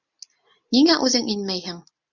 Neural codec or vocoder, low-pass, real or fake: none; 7.2 kHz; real